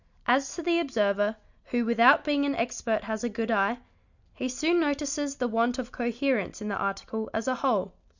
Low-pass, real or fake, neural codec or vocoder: 7.2 kHz; real; none